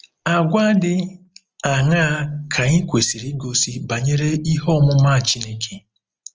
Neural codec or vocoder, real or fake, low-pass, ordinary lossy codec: none; real; 7.2 kHz; Opus, 24 kbps